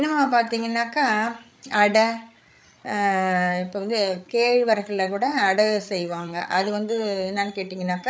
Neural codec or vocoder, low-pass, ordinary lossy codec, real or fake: codec, 16 kHz, 8 kbps, FreqCodec, larger model; none; none; fake